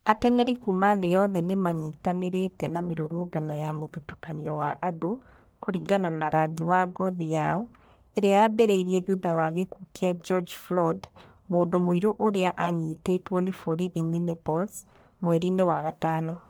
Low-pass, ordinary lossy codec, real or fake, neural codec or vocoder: none; none; fake; codec, 44.1 kHz, 1.7 kbps, Pupu-Codec